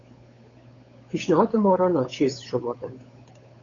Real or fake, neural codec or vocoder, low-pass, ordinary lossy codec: fake; codec, 16 kHz, 8 kbps, FunCodec, trained on Chinese and English, 25 frames a second; 7.2 kHz; AAC, 32 kbps